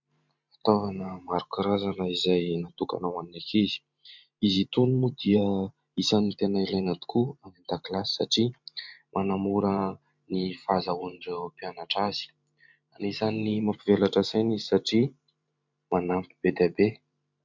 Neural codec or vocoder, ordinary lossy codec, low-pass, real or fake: vocoder, 44.1 kHz, 128 mel bands every 512 samples, BigVGAN v2; MP3, 64 kbps; 7.2 kHz; fake